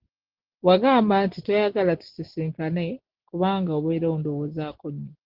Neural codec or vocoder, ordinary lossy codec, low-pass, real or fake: none; Opus, 16 kbps; 5.4 kHz; real